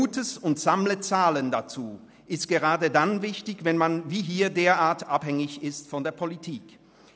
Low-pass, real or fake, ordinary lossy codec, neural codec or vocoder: none; real; none; none